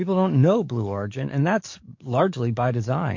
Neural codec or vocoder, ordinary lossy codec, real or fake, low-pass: codec, 44.1 kHz, 7.8 kbps, DAC; MP3, 48 kbps; fake; 7.2 kHz